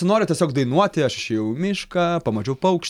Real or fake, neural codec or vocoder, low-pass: real; none; 19.8 kHz